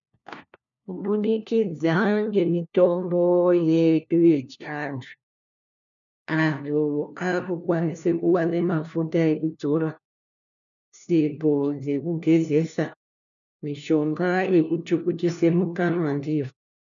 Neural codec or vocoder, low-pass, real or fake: codec, 16 kHz, 1 kbps, FunCodec, trained on LibriTTS, 50 frames a second; 7.2 kHz; fake